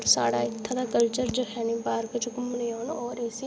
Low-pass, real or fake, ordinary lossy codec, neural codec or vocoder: none; real; none; none